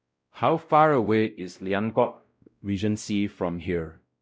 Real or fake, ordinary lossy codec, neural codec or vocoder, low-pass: fake; none; codec, 16 kHz, 0.5 kbps, X-Codec, WavLM features, trained on Multilingual LibriSpeech; none